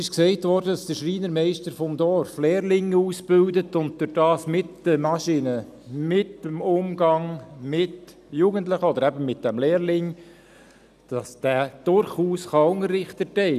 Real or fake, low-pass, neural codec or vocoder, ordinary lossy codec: real; 14.4 kHz; none; none